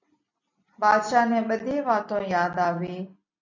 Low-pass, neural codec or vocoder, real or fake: 7.2 kHz; none; real